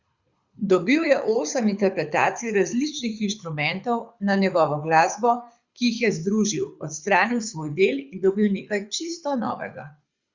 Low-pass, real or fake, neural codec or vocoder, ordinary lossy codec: 7.2 kHz; fake; codec, 24 kHz, 6 kbps, HILCodec; Opus, 64 kbps